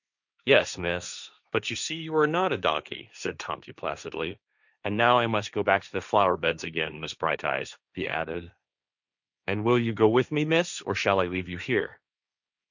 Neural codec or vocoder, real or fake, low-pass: codec, 16 kHz, 1.1 kbps, Voila-Tokenizer; fake; 7.2 kHz